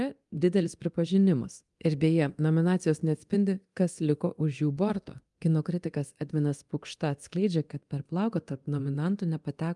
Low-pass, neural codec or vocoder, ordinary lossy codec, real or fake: 10.8 kHz; codec, 24 kHz, 0.9 kbps, DualCodec; Opus, 32 kbps; fake